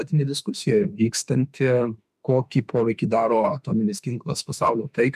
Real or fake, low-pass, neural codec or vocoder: fake; 14.4 kHz; autoencoder, 48 kHz, 32 numbers a frame, DAC-VAE, trained on Japanese speech